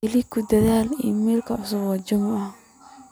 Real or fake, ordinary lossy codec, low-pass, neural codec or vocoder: real; none; none; none